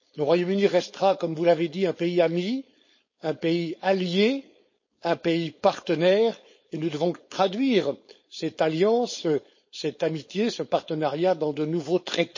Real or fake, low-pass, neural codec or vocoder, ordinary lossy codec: fake; 7.2 kHz; codec, 16 kHz, 4.8 kbps, FACodec; MP3, 32 kbps